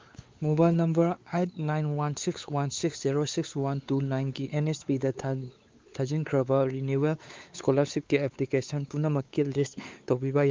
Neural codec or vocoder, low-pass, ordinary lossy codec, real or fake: codec, 16 kHz, 4 kbps, X-Codec, WavLM features, trained on Multilingual LibriSpeech; 7.2 kHz; Opus, 32 kbps; fake